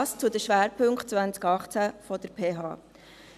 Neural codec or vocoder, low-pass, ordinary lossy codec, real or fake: none; 14.4 kHz; none; real